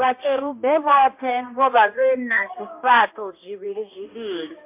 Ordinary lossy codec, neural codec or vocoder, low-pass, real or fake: MP3, 32 kbps; codec, 16 kHz, 1 kbps, X-Codec, HuBERT features, trained on balanced general audio; 3.6 kHz; fake